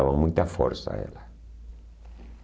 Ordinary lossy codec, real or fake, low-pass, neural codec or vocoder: none; real; none; none